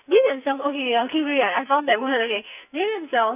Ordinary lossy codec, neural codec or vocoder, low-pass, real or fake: none; codec, 44.1 kHz, 2.6 kbps, SNAC; 3.6 kHz; fake